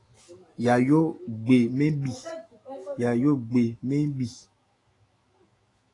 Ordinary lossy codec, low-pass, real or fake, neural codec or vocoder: AAC, 32 kbps; 10.8 kHz; fake; autoencoder, 48 kHz, 128 numbers a frame, DAC-VAE, trained on Japanese speech